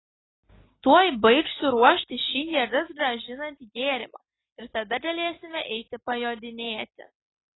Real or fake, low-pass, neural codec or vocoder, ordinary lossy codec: real; 7.2 kHz; none; AAC, 16 kbps